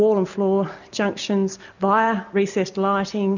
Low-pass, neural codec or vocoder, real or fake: 7.2 kHz; none; real